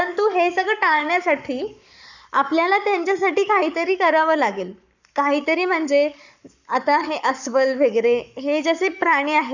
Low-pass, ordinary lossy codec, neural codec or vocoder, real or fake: 7.2 kHz; none; codec, 44.1 kHz, 7.8 kbps, Pupu-Codec; fake